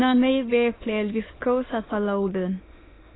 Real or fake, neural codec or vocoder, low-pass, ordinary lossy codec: fake; autoencoder, 22.05 kHz, a latent of 192 numbers a frame, VITS, trained on many speakers; 7.2 kHz; AAC, 16 kbps